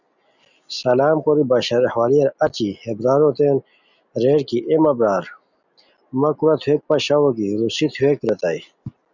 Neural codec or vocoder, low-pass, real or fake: none; 7.2 kHz; real